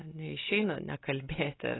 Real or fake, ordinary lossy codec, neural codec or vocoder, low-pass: real; AAC, 16 kbps; none; 7.2 kHz